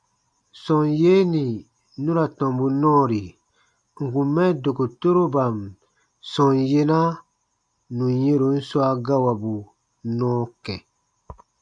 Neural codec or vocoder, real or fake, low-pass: none; real; 9.9 kHz